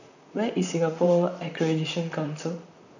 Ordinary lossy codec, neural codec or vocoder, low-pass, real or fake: none; vocoder, 44.1 kHz, 128 mel bands, Pupu-Vocoder; 7.2 kHz; fake